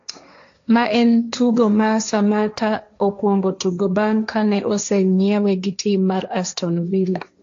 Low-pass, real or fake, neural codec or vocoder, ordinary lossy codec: 7.2 kHz; fake; codec, 16 kHz, 1.1 kbps, Voila-Tokenizer; AAC, 48 kbps